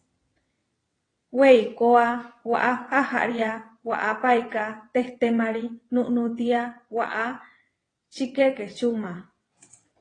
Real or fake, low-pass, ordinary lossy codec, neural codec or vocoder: fake; 9.9 kHz; AAC, 32 kbps; vocoder, 22.05 kHz, 80 mel bands, WaveNeXt